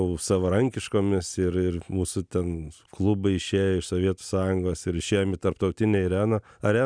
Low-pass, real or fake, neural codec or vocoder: 9.9 kHz; real; none